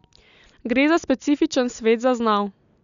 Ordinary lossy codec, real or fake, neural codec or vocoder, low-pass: none; real; none; 7.2 kHz